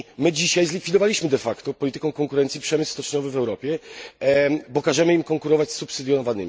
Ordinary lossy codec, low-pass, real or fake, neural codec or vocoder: none; none; real; none